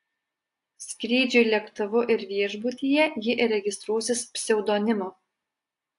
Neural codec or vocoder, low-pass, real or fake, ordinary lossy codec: none; 10.8 kHz; real; AAC, 64 kbps